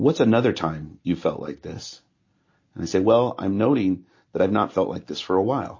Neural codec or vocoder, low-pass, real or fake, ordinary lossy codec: none; 7.2 kHz; real; MP3, 32 kbps